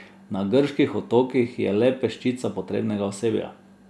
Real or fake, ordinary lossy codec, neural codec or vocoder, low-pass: real; none; none; none